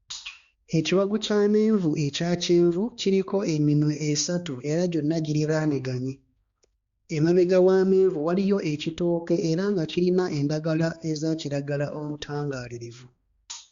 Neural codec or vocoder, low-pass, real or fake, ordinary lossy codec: codec, 16 kHz, 2 kbps, X-Codec, HuBERT features, trained on balanced general audio; 7.2 kHz; fake; Opus, 64 kbps